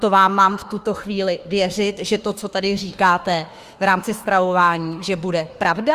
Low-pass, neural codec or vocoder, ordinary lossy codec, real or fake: 14.4 kHz; autoencoder, 48 kHz, 32 numbers a frame, DAC-VAE, trained on Japanese speech; Opus, 32 kbps; fake